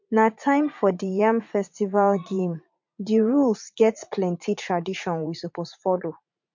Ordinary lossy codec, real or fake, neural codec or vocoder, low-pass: MP3, 48 kbps; fake; vocoder, 44.1 kHz, 80 mel bands, Vocos; 7.2 kHz